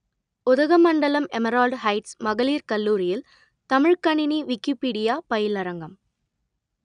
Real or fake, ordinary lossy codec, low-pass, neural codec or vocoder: real; none; 9.9 kHz; none